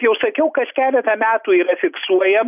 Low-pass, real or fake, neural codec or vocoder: 3.6 kHz; real; none